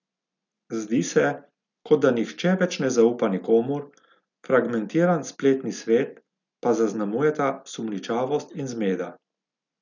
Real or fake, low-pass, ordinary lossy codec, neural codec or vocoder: real; 7.2 kHz; none; none